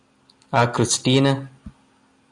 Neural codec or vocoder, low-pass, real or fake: none; 10.8 kHz; real